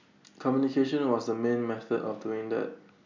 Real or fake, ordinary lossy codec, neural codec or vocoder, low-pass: real; MP3, 64 kbps; none; 7.2 kHz